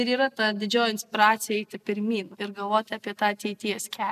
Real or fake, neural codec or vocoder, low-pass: real; none; 14.4 kHz